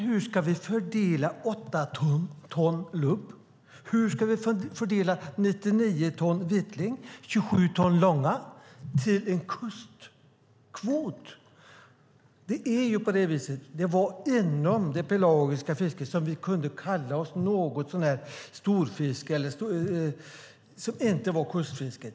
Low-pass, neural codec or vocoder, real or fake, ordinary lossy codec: none; none; real; none